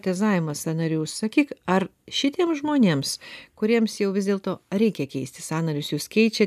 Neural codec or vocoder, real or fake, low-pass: none; real; 14.4 kHz